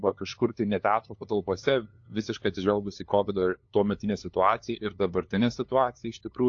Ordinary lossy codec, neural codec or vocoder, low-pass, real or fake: AAC, 48 kbps; codec, 16 kHz, 2 kbps, FunCodec, trained on LibriTTS, 25 frames a second; 7.2 kHz; fake